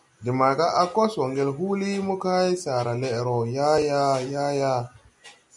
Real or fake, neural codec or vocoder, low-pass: real; none; 10.8 kHz